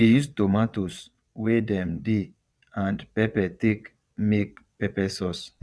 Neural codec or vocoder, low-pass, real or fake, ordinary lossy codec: vocoder, 22.05 kHz, 80 mel bands, WaveNeXt; none; fake; none